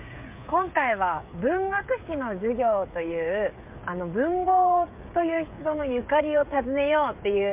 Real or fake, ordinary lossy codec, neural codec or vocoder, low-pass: fake; MP3, 32 kbps; codec, 16 kHz, 8 kbps, FreqCodec, smaller model; 3.6 kHz